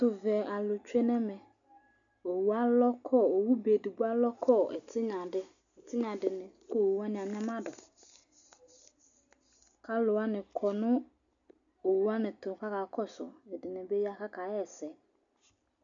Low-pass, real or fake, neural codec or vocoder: 7.2 kHz; real; none